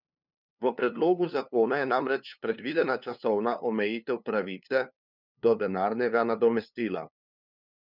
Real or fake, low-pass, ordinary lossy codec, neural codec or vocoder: fake; 5.4 kHz; none; codec, 16 kHz, 2 kbps, FunCodec, trained on LibriTTS, 25 frames a second